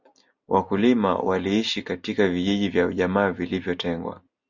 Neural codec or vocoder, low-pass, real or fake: none; 7.2 kHz; real